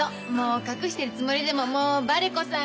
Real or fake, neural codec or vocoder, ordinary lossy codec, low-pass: real; none; none; none